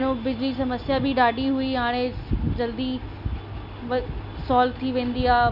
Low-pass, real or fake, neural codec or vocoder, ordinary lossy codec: 5.4 kHz; real; none; none